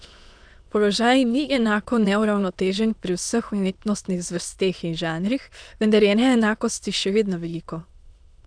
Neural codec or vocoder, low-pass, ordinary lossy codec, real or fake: autoencoder, 22.05 kHz, a latent of 192 numbers a frame, VITS, trained on many speakers; 9.9 kHz; none; fake